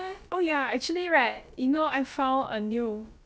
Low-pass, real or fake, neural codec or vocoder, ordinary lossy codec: none; fake; codec, 16 kHz, about 1 kbps, DyCAST, with the encoder's durations; none